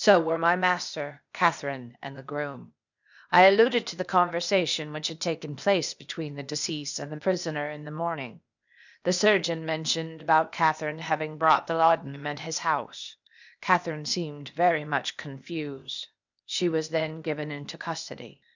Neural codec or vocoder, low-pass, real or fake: codec, 16 kHz, 0.8 kbps, ZipCodec; 7.2 kHz; fake